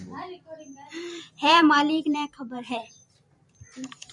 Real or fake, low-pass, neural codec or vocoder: fake; 10.8 kHz; vocoder, 44.1 kHz, 128 mel bands every 512 samples, BigVGAN v2